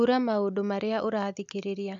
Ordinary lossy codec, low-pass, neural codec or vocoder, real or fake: MP3, 96 kbps; 7.2 kHz; none; real